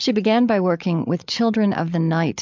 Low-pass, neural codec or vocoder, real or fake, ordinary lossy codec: 7.2 kHz; codec, 16 kHz, 8 kbps, FreqCodec, larger model; fake; MP3, 64 kbps